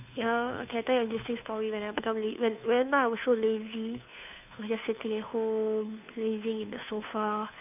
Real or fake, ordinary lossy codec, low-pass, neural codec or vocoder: fake; none; 3.6 kHz; codec, 16 kHz, 2 kbps, FunCodec, trained on Chinese and English, 25 frames a second